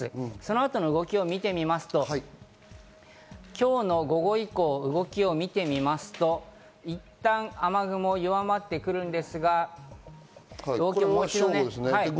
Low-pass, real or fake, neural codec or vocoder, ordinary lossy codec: none; real; none; none